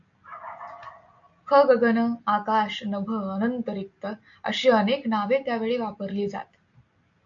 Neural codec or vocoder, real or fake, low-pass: none; real; 7.2 kHz